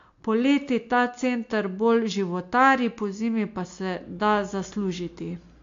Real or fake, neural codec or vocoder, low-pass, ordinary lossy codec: real; none; 7.2 kHz; AAC, 48 kbps